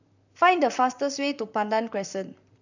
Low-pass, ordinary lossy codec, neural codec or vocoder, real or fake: 7.2 kHz; none; vocoder, 44.1 kHz, 128 mel bands, Pupu-Vocoder; fake